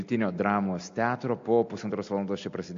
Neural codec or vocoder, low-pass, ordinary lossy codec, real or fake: none; 7.2 kHz; AAC, 48 kbps; real